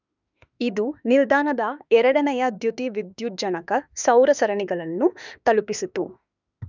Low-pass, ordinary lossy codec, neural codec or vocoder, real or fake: 7.2 kHz; none; autoencoder, 48 kHz, 32 numbers a frame, DAC-VAE, trained on Japanese speech; fake